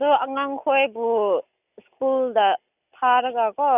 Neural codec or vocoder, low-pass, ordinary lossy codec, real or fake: none; 3.6 kHz; none; real